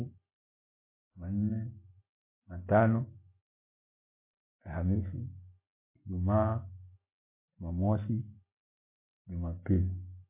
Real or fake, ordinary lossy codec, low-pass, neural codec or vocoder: real; MP3, 24 kbps; 3.6 kHz; none